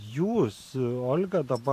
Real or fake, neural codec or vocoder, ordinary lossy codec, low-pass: fake; vocoder, 44.1 kHz, 128 mel bands every 512 samples, BigVGAN v2; AAC, 64 kbps; 14.4 kHz